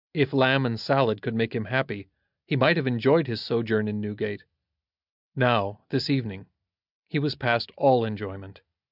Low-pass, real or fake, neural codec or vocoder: 5.4 kHz; real; none